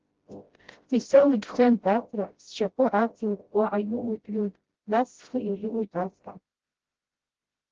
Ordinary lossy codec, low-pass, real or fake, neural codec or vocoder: Opus, 16 kbps; 7.2 kHz; fake; codec, 16 kHz, 0.5 kbps, FreqCodec, smaller model